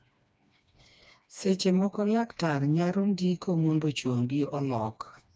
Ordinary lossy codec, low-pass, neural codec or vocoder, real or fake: none; none; codec, 16 kHz, 2 kbps, FreqCodec, smaller model; fake